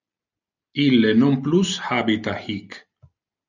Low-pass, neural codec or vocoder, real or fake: 7.2 kHz; none; real